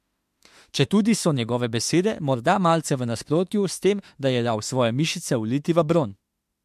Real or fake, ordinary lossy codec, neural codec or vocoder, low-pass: fake; MP3, 64 kbps; autoencoder, 48 kHz, 32 numbers a frame, DAC-VAE, trained on Japanese speech; 14.4 kHz